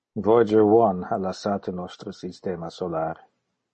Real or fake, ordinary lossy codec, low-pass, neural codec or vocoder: real; MP3, 32 kbps; 10.8 kHz; none